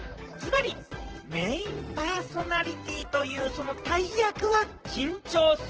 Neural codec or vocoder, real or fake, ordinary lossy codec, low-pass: vocoder, 44.1 kHz, 128 mel bands, Pupu-Vocoder; fake; Opus, 16 kbps; 7.2 kHz